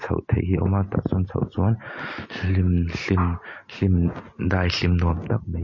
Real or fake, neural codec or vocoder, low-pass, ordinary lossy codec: real; none; 7.2 kHz; MP3, 32 kbps